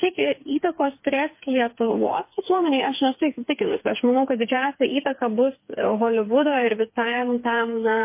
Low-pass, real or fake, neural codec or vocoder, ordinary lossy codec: 3.6 kHz; fake; codec, 16 kHz, 4 kbps, FreqCodec, smaller model; MP3, 24 kbps